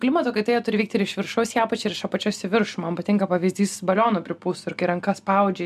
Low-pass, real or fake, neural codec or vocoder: 14.4 kHz; real; none